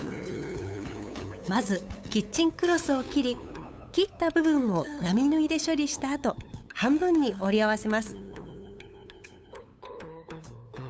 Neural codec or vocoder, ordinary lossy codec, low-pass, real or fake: codec, 16 kHz, 8 kbps, FunCodec, trained on LibriTTS, 25 frames a second; none; none; fake